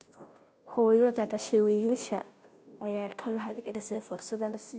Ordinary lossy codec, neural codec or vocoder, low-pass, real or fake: none; codec, 16 kHz, 0.5 kbps, FunCodec, trained on Chinese and English, 25 frames a second; none; fake